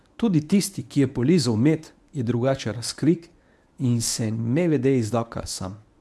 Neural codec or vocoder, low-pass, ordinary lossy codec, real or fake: codec, 24 kHz, 0.9 kbps, WavTokenizer, medium speech release version 1; none; none; fake